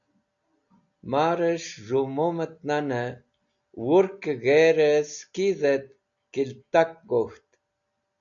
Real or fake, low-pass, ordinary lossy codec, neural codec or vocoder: real; 7.2 kHz; MP3, 96 kbps; none